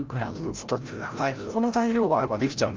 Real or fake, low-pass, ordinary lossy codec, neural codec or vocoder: fake; 7.2 kHz; Opus, 24 kbps; codec, 16 kHz, 0.5 kbps, FreqCodec, larger model